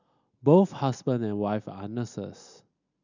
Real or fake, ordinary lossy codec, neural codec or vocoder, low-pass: real; none; none; 7.2 kHz